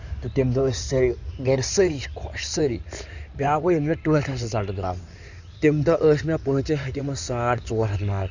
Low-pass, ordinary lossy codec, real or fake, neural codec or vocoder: 7.2 kHz; none; fake; codec, 16 kHz in and 24 kHz out, 2.2 kbps, FireRedTTS-2 codec